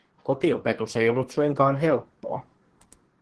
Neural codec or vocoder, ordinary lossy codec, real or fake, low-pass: codec, 24 kHz, 1 kbps, SNAC; Opus, 16 kbps; fake; 10.8 kHz